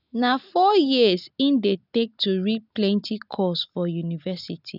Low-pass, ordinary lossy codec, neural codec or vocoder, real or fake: 5.4 kHz; none; none; real